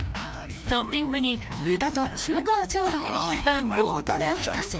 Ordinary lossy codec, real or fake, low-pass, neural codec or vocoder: none; fake; none; codec, 16 kHz, 1 kbps, FreqCodec, larger model